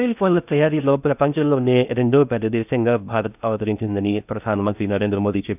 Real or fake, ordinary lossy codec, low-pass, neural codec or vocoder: fake; none; 3.6 kHz; codec, 16 kHz in and 24 kHz out, 0.6 kbps, FocalCodec, streaming, 2048 codes